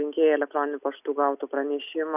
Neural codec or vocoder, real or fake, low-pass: none; real; 3.6 kHz